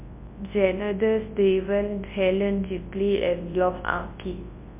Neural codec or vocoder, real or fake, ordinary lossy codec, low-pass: codec, 24 kHz, 0.9 kbps, WavTokenizer, large speech release; fake; MP3, 24 kbps; 3.6 kHz